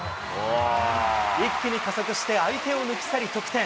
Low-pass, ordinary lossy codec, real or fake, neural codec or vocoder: none; none; real; none